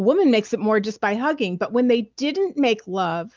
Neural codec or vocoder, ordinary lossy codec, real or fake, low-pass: none; Opus, 32 kbps; real; 7.2 kHz